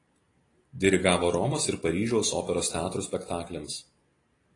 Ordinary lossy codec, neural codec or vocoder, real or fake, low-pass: AAC, 32 kbps; none; real; 10.8 kHz